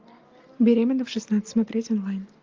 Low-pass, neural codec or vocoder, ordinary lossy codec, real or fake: 7.2 kHz; none; Opus, 32 kbps; real